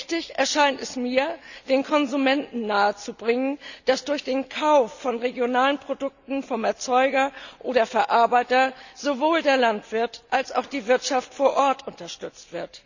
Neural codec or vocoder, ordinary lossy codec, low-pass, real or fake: none; none; 7.2 kHz; real